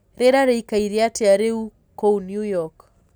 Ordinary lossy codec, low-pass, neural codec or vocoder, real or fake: none; none; none; real